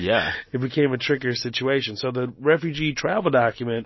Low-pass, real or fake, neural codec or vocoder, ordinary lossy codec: 7.2 kHz; real; none; MP3, 24 kbps